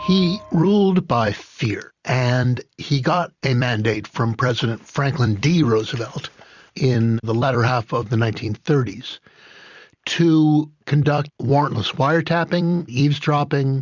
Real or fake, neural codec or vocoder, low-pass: fake; vocoder, 44.1 kHz, 128 mel bands every 256 samples, BigVGAN v2; 7.2 kHz